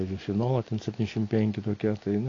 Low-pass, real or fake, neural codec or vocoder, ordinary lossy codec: 7.2 kHz; real; none; AAC, 32 kbps